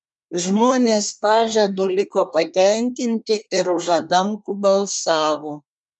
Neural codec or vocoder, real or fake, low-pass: codec, 24 kHz, 1 kbps, SNAC; fake; 10.8 kHz